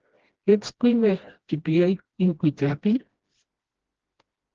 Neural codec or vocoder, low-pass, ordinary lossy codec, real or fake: codec, 16 kHz, 1 kbps, FreqCodec, smaller model; 7.2 kHz; Opus, 16 kbps; fake